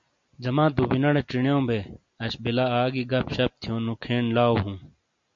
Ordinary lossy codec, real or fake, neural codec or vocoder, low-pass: MP3, 48 kbps; real; none; 7.2 kHz